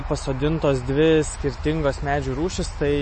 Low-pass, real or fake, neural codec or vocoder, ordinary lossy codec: 9.9 kHz; real; none; MP3, 32 kbps